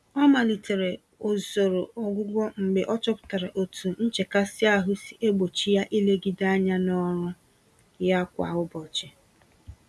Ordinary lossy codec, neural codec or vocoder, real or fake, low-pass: none; none; real; none